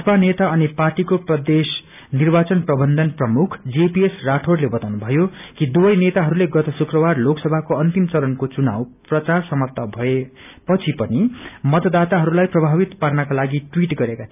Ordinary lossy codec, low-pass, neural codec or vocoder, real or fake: none; 3.6 kHz; none; real